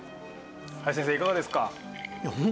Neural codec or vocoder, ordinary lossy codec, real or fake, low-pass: none; none; real; none